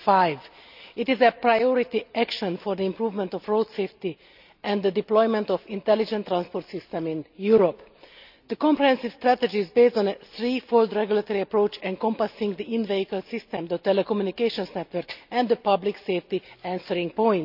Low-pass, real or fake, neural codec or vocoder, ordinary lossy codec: 5.4 kHz; real; none; none